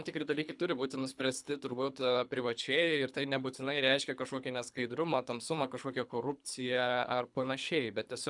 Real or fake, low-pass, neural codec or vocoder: fake; 10.8 kHz; codec, 24 kHz, 3 kbps, HILCodec